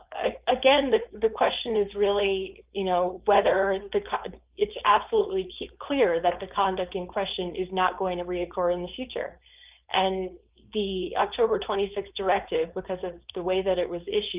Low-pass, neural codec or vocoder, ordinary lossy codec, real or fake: 3.6 kHz; codec, 16 kHz, 4.8 kbps, FACodec; Opus, 24 kbps; fake